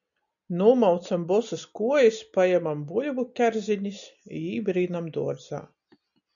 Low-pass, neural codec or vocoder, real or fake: 7.2 kHz; none; real